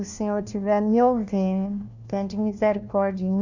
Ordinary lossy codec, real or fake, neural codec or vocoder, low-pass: none; fake; codec, 16 kHz, 1 kbps, FunCodec, trained on LibriTTS, 50 frames a second; 7.2 kHz